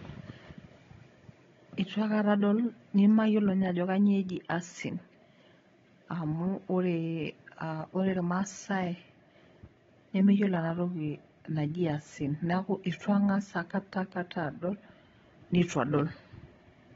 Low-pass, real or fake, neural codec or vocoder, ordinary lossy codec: 7.2 kHz; fake; codec, 16 kHz, 16 kbps, FunCodec, trained on Chinese and English, 50 frames a second; AAC, 24 kbps